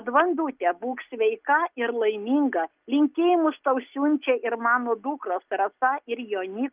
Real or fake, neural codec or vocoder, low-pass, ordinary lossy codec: real; none; 3.6 kHz; Opus, 32 kbps